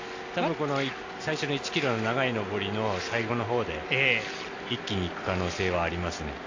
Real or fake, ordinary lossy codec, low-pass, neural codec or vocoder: real; AAC, 48 kbps; 7.2 kHz; none